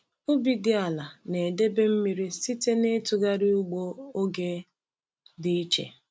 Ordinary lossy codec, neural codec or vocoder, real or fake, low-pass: none; none; real; none